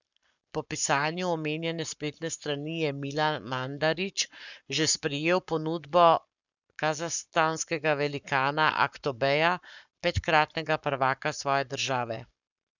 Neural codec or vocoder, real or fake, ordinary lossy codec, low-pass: codec, 44.1 kHz, 7.8 kbps, Pupu-Codec; fake; none; 7.2 kHz